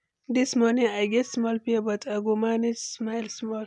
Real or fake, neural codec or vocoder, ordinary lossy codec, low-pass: real; none; MP3, 96 kbps; 10.8 kHz